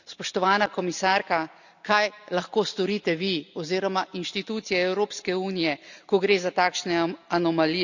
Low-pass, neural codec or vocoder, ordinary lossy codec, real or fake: 7.2 kHz; none; none; real